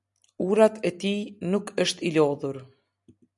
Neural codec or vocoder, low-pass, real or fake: none; 10.8 kHz; real